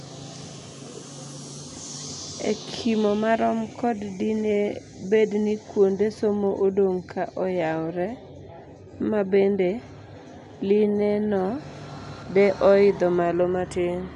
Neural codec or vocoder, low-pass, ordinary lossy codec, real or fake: none; 10.8 kHz; none; real